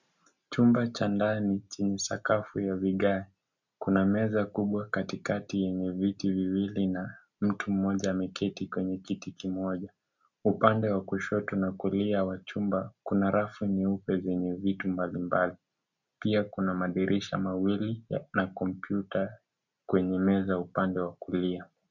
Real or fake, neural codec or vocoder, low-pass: real; none; 7.2 kHz